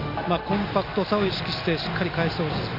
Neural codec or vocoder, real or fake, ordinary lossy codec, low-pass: none; real; none; 5.4 kHz